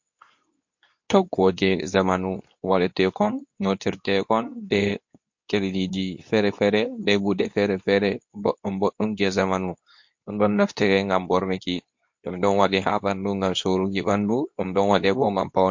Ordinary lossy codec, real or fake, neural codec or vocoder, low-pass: MP3, 48 kbps; fake; codec, 24 kHz, 0.9 kbps, WavTokenizer, medium speech release version 2; 7.2 kHz